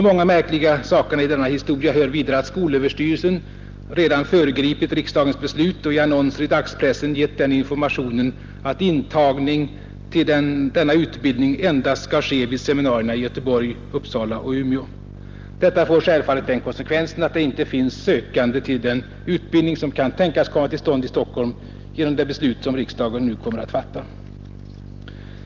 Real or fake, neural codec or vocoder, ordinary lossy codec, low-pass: real; none; Opus, 32 kbps; 7.2 kHz